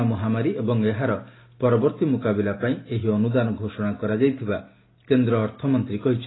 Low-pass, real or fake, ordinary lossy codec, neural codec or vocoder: 7.2 kHz; real; AAC, 16 kbps; none